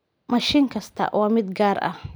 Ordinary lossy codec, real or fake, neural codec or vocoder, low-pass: none; real; none; none